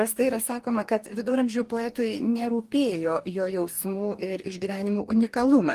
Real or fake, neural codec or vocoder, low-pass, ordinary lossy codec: fake; codec, 44.1 kHz, 2.6 kbps, DAC; 14.4 kHz; Opus, 24 kbps